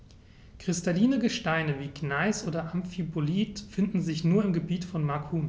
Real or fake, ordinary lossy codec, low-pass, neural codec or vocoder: real; none; none; none